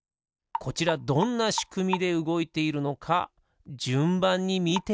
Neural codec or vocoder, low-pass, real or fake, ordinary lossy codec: none; none; real; none